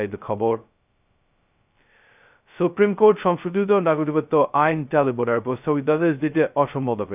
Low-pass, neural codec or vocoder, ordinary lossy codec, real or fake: 3.6 kHz; codec, 16 kHz, 0.2 kbps, FocalCodec; none; fake